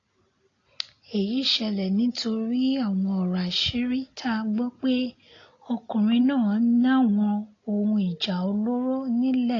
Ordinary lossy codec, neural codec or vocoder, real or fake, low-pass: AAC, 32 kbps; none; real; 7.2 kHz